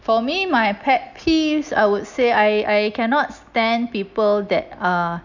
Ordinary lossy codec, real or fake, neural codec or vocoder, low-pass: none; real; none; 7.2 kHz